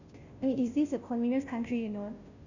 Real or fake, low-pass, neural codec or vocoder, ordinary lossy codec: fake; 7.2 kHz; codec, 16 kHz, 0.5 kbps, FunCodec, trained on Chinese and English, 25 frames a second; none